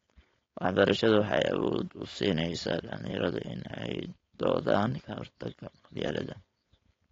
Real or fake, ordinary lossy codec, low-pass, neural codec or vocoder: fake; AAC, 32 kbps; 7.2 kHz; codec, 16 kHz, 4.8 kbps, FACodec